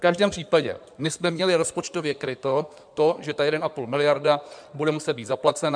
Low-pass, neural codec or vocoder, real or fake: 9.9 kHz; codec, 16 kHz in and 24 kHz out, 2.2 kbps, FireRedTTS-2 codec; fake